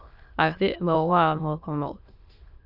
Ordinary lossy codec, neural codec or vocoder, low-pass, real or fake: Opus, 32 kbps; autoencoder, 22.05 kHz, a latent of 192 numbers a frame, VITS, trained on many speakers; 5.4 kHz; fake